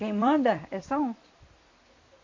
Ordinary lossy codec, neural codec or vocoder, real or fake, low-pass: MP3, 48 kbps; vocoder, 44.1 kHz, 128 mel bands every 256 samples, BigVGAN v2; fake; 7.2 kHz